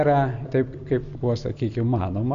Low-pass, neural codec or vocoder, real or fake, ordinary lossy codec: 7.2 kHz; none; real; AAC, 96 kbps